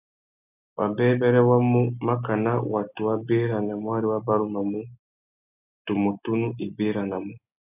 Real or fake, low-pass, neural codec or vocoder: real; 3.6 kHz; none